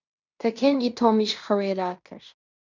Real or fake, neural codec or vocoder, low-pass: fake; codec, 16 kHz in and 24 kHz out, 0.9 kbps, LongCat-Audio-Codec, fine tuned four codebook decoder; 7.2 kHz